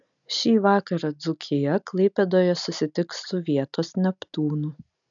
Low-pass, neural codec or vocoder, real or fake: 7.2 kHz; none; real